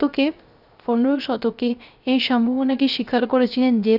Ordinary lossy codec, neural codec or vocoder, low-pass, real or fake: none; codec, 16 kHz, 0.3 kbps, FocalCodec; 5.4 kHz; fake